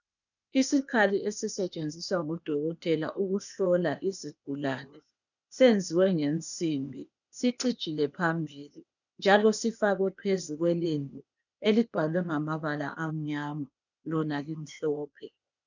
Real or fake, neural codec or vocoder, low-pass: fake; codec, 16 kHz, 0.8 kbps, ZipCodec; 7.2 kHz